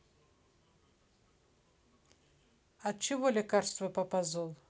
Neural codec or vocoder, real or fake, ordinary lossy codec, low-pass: none; real; none; none